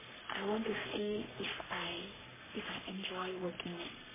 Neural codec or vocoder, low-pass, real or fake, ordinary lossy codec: codec, 44.1 kHz, 3.4 kbps, Pupu-Codec; 3.6 kHz; fake; MP3, 16 kbps